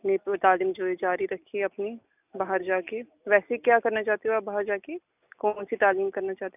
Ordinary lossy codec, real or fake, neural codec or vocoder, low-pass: none; real; none; 3.6 kHz